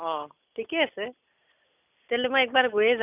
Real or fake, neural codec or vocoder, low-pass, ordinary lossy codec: real; none; 3.6 kHz; none